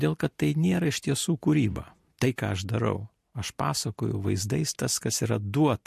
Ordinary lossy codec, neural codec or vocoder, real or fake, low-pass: MP3, 64 kbps; none; real; 14.4 kHz